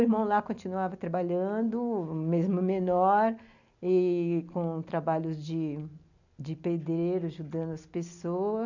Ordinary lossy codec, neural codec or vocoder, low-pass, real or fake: none; none; 7.2 kHz; real